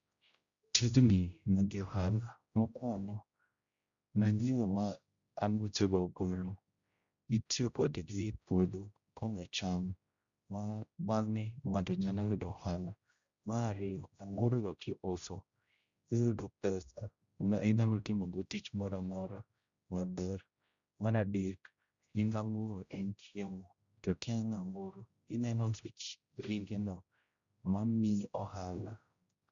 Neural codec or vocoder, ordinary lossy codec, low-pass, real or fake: codec, 16 kHz, 0.5 kbps, X-Codec, HuBERT features, trained on general audio; Opus, 64 kbps; 7.2 kHz; fake